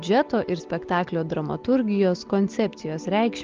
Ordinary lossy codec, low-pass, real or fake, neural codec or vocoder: Opus, 32 kbps; 7.2 kHz; real; none